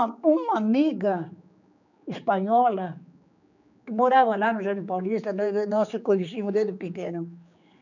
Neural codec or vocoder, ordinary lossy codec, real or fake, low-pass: codec, 16 kHz, 4 kbps, X-Codec, HuBERT features, trained on general audio; none; fake; 7.2 kHz